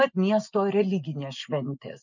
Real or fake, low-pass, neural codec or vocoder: real; 7.2 kHz; none